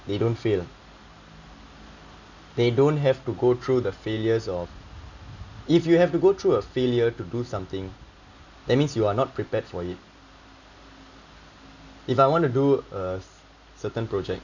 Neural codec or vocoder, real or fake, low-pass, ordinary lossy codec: none; real; 7.2 kHz; none